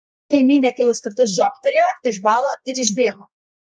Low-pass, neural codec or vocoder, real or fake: 9.9 kHz; codec, 24 kHz, 0.9 kbps, WavTokenizer, medium music audio release; fake